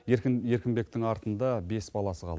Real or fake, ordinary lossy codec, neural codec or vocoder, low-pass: real; none; none; none